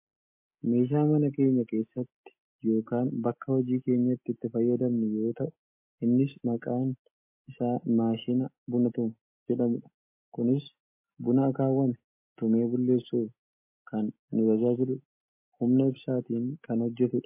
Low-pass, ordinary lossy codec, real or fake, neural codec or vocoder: 3.6 kHz; MP3, 24 kbps; real; none